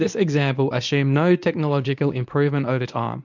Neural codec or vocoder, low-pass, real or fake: codec, 24 kHz, 0.9 kbps, WavTokenizer, medium speech release version 1; 7.2 kHz; fake